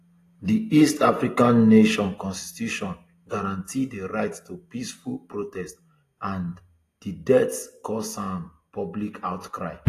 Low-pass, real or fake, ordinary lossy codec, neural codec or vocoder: 14.4 kHz; real; AAC, 48 kbps; none